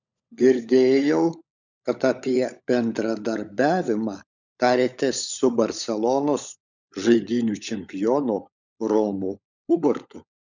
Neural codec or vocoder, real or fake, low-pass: codec, 16 kHz, 16 kbps, FunCodec, trained on LibriTTS, 50 frames a second; fake; 7.2 kHz